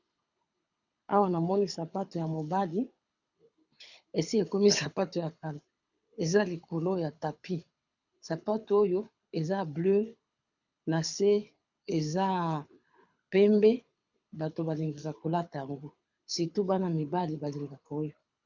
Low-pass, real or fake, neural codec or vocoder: 7.2 kHz; fake; codec, 24 kHz, 6 kbps, HILCodec